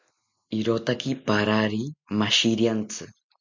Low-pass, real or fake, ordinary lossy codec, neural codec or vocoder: 7.2 kHz; real; MP3, 64 kbps; none